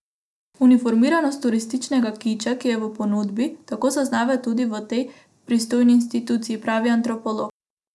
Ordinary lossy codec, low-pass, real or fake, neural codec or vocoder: none; none; real; none